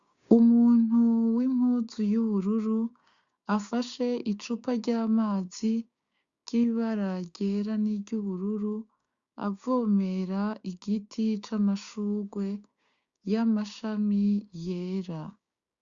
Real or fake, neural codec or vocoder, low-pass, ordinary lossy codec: fake; codec, 16 kHz, 6 kbps, DAC; 7.2 kHz; Opus, 64 kbps